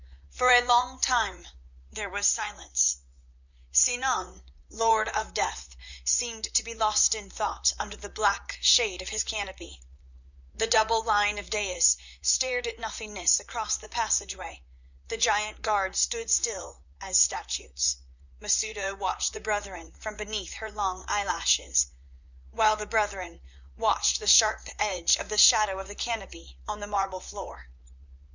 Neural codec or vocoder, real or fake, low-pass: vocoder, 44.1 kHz, 128 mel bands, Pupu-Vocoder; fake; 7.2 kHz